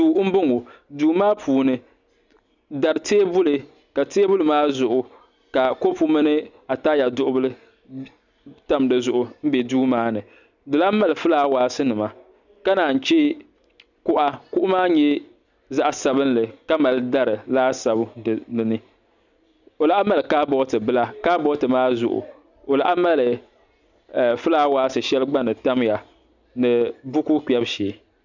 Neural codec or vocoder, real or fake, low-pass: none; real; 7.2 kHz